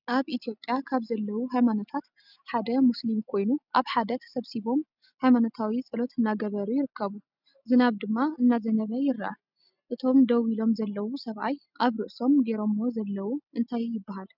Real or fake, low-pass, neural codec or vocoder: real; 5.4 kHz; none